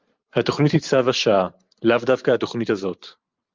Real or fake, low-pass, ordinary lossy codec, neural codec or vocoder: fake; 7.2 kHz; Opus, 32 kbps; vocoder, 44.1 kHz, 128 mel bands every 512 samples, BigVGAN v2